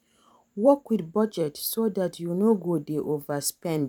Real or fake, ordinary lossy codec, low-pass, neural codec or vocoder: fake; none; 19.8 kHz; vocoder, 44.1 kHz, 128 mel bands every 512 samples, BigVGAN v2